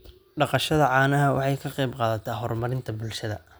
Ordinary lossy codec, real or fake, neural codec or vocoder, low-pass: none; real; none; none